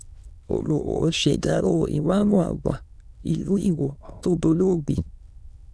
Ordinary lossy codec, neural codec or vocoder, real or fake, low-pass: none; autoencoder, 22.05 kHz, a latent of 192 numbers a frame, VITS, trained on many speakers; fake; none